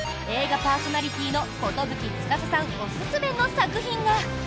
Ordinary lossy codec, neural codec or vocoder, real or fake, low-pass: none; none; real; none